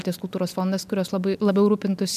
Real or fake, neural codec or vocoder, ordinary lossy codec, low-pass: real; none; MP3, 96 kbps; 14.4 kHz